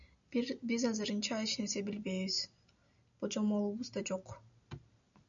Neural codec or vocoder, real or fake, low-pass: none; real; 7.2 kHz